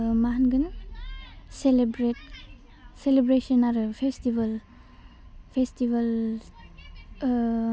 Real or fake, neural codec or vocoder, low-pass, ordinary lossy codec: real; none; none; none